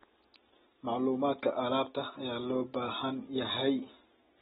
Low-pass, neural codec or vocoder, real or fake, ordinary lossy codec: 19.8 kHz; none; real; AAC, 16 kbps